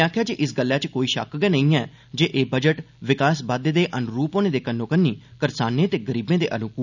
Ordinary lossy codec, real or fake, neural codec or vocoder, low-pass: none; real; none; 7.2 kHz